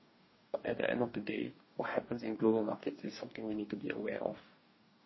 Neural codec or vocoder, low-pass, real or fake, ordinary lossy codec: codec, 44.1 kHz, 2.6 kbps, DAC; 7.2 kHz; fake; MP3, 24 kbps